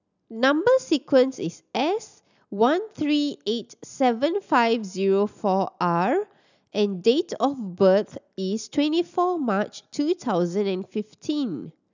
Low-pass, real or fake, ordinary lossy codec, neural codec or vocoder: 7.2 kHz; real; none; none